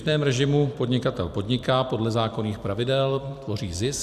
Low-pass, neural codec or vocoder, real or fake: 14.4 kHz; vocoder, 44.1 kHz, 128 mel bands every 256 samples, BigVGAN v2; fake